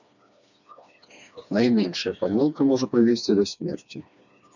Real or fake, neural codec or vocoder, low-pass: fake; codec, 16 kHz, 2 kbps, FreqCodec, smaller model; 7.2 kHz